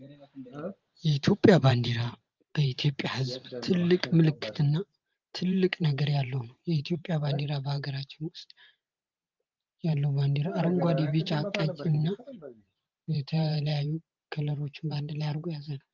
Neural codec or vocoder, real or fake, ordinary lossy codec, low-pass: vocoder, 44.1 kHz, 128 mel bands every 512 samples, BigVGAN v2; fake; Opus, 24 kbps; 7.2 kHz